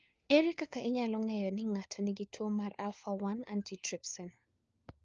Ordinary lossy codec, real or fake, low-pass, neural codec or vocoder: Opus, 24 kbps; fake; 7.2 kHz; codec, 16 kHz, 4 kbps, FunCodec, trained on LibriTTS, 50 frames a second